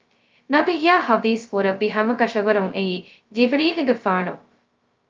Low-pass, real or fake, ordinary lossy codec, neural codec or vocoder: 7.2 kHz; fake; Opus, 24 kbps; codec, 16 kHz, 0.2 kbps, FocalCodec